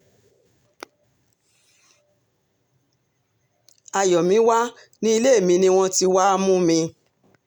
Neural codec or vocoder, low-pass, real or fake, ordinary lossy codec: none; 19.8 kHz; real; none